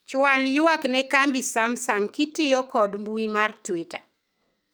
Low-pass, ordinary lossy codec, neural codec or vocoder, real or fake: none; none; codec, 44.1 kHz, 2.6 kbps, SNAC; fake